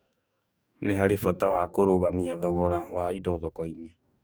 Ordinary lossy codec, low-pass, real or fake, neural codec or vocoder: none; none; fake; codec, 44.1 kHz, 2.6 kbps, DAC